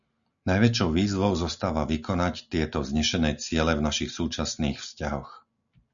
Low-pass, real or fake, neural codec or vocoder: 7.2 kHz; real; none